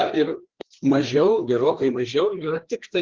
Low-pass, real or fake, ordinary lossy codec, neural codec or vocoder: 7.2 kHz; fake; Opus, 16 kbps; codec, 16 kHz, 2 kbps, FreqCodec, larger model